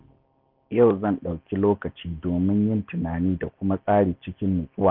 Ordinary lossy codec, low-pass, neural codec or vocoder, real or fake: none; 5.4 kHz; none; real